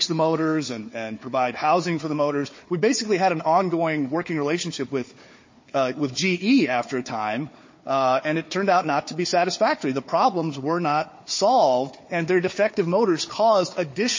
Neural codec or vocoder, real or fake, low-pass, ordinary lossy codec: codec, 16 kHz, 4 kbps, FunCodec, trained on Chinese and English, 50 frames a second; fake; 7.2 kHz; MP3, 32 kbps